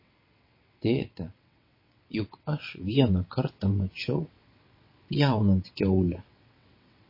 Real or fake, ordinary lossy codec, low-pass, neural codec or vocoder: fake; MP3, 24 kbps; 5.4 kHz; vocoder, 22.05 kHz, 80 mel bands, WaveNeXt